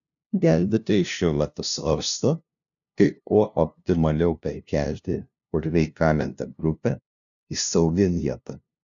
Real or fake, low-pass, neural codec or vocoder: fake; 7.2 kHz; codec, 16 kHz, 0.5 kbps, FunCodec, trained on LibriTTS, 25 frames a second